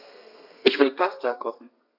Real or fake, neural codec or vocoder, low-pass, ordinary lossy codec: fake; codec, 32 kHz, 1.9 kbps, SNAC; 5.4 kHz; AAC, 48 kbps